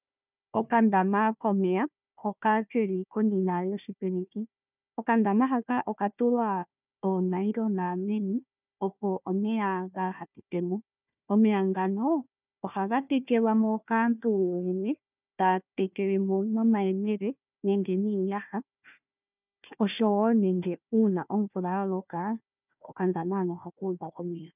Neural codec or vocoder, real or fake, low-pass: codec, 16 kHz, 1 kbps, FunCodec, trained on Chinese and English, 50 frames a second; fake; 3.6 kHz